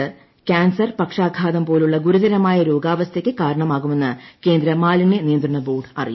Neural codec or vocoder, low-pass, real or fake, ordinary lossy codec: none; 7.2 kHz; real; MP3, 24 kbps